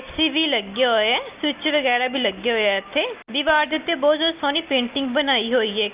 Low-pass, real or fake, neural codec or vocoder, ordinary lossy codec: 3.6 kHz; real; none; Opus, 24 kbps